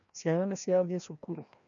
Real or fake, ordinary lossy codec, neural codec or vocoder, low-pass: fake; none; codec, 16 kHz, 1 kbps, FreqCodec, larger model; 7.2 kHz